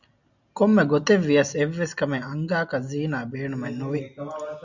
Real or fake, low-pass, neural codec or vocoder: real; 7.2 kHz; none